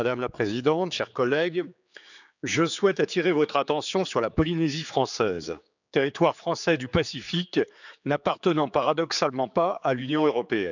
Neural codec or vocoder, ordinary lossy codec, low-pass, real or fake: codec, 16 kHz, 4 kbps, X-Codec, HuBERT features, trained on general audio; none; 7.2 kHz; fake